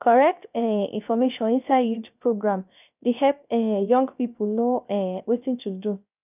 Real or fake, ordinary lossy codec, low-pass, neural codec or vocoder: fake; none; 3.6 kHz; codec, 16 kHz, 0.3 kbps, FocalCodec